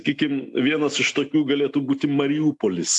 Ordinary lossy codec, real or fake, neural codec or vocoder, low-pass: AAC, 64 kbps; real; none; 10.8 kHz